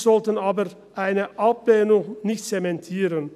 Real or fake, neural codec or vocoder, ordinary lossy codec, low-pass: real; none; none; 14.4 kHz